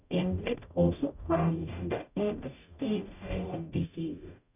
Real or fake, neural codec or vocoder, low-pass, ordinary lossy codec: fake; codec, 44.1 kHz, 0.9 kbps, DAC; 3.6 kHz; none